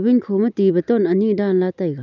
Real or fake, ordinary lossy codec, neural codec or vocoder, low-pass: real; none; none; 7.2 kHz